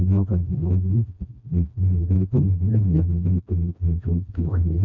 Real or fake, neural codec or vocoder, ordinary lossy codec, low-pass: fake; codec, 16 kHz, 1 kbps, FreqCodec, smaller model; none; 7.2 kHz